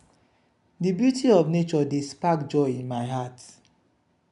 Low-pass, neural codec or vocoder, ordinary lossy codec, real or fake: 10.8 kHz; none; none; real